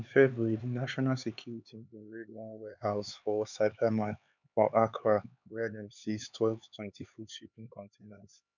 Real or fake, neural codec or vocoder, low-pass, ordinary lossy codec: fake; codec, 16 kHz, 4 kbps, X-Codec, HuBERT features, trained on LibriSpeech; 7.2 kHz; none